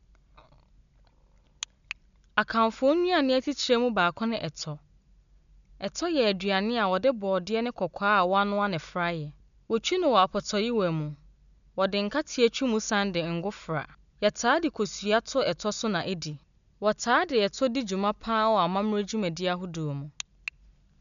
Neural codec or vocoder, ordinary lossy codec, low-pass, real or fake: none; none; 7.2 kHz; real